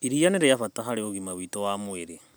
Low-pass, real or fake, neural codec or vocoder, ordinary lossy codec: none; real; none; none